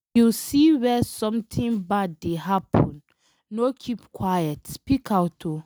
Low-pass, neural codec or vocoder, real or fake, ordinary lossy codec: none; none; real; none